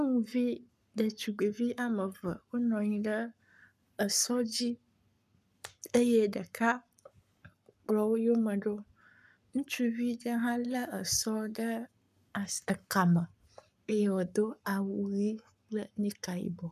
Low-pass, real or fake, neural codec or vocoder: 14.4 kHz; fake; codec, 44.1 kHz, 7.8 kbps, Pupu-Codec